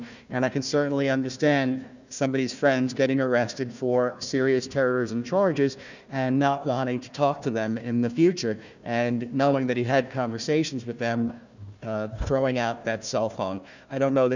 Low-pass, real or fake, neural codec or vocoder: 7.2 kHz; fake; codec, 16 kHz, 1 kbps, FunCodec, trained on Chinese and English, 50 frames a second